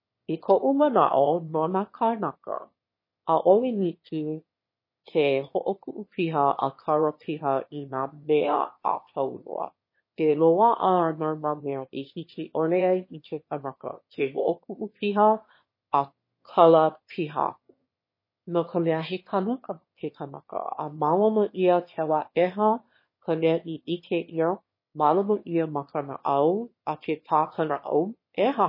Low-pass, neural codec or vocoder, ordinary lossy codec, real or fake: 5.4 kHz; autoencoder, 22.05 kHz, a latent of 192 numbers a frame, VITS, trained on one speaker; MP3, 24 kbps; fake